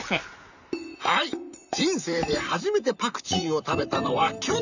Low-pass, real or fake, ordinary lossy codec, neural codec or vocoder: 7.2 kHz; fake; none; vocoder, 44.1 kHz, 128 mel bands, Pupu-Vocoder